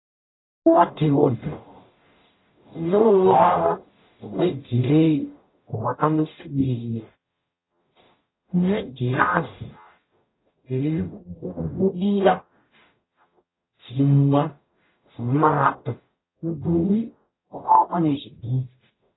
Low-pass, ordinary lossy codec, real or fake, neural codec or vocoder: 7.2 kHz; AAC, 16 kbps; fake; codec, 44.1 kHz, 0.9 kbps, DAC